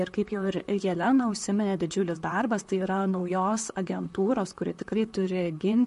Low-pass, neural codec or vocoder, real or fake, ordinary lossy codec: 14.4 kHz; vocoder, 44.1 kHz, 128 mel bands, Pupu-Vocoder; fake; MP3, 48 kbps